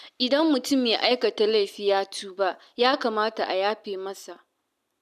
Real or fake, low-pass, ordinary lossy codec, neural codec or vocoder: real; 14.4 kHz; none; none